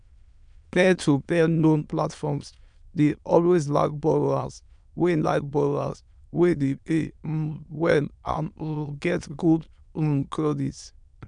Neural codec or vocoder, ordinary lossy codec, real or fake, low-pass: autoencoder, 22.05 kHz, a latent of 192 numbers a frame, VITS, trained on many speakers; none; fake; 9.9 kHz